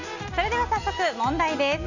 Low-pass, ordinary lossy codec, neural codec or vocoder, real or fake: 7.2 kHz; none; none; real